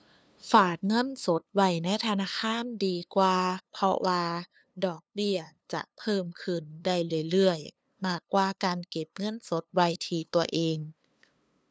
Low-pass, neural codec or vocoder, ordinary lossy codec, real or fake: none; codec, 16 kHz, 2 kbps, FunCodec, trained on LibriTTS, 25 frames a second; none; fake